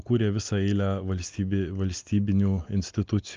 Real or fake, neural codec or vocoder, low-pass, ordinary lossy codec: real; none; 7.2 kHz; Opus, 24 kbps